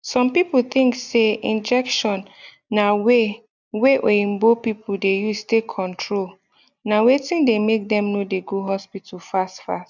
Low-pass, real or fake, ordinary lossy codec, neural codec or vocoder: 7.2 kHz; real; none; none